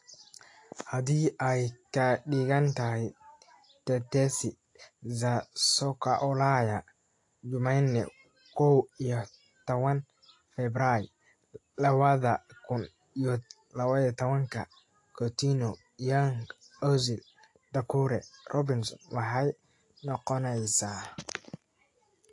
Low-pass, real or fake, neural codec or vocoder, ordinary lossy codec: 10.8 kHz; real; none; AAC, 48 kbps